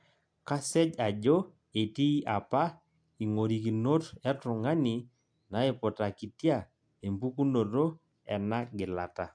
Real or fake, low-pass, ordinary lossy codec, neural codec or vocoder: real; 9.9 kHz; AAC, 64 kbps; none